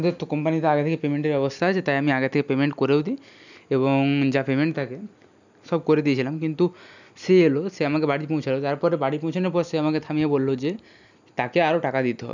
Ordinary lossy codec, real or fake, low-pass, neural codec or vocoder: none; real; 7.2 kHz; none